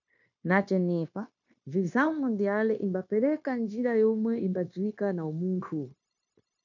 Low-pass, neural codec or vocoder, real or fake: 7.2 kHz; codec, 16 kHz, 0.9 kbps, LongCat-Audio-Codec; fake